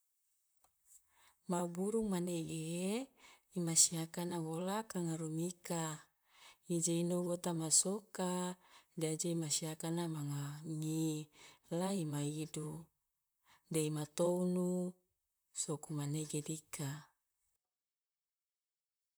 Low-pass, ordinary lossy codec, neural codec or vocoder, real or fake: none; none; vocoder, 44.1 kHz, 128 mel bands, Pupu-Vocoder; fake